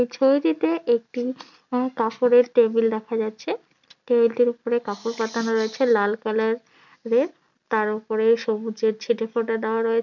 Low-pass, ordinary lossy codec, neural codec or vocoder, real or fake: 7.2 kHz; none; none; real